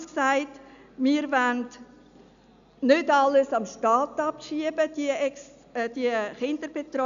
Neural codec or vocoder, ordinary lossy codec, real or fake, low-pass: none; none; real; 7.2 kHz